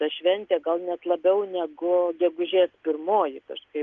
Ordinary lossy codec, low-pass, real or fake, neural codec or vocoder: Opus, 24 kbps; 7.2 kHz; real; none